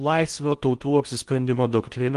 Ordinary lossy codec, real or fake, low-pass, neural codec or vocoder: Opus, 24 kbps; fake; 10.8 kHz; codec, 16 kHz in and 24 kHz out, 0.6 kbps, FocalCodec, streaming, 4096 codes